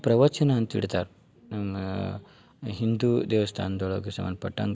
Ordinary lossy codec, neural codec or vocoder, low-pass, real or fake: none; none; none; real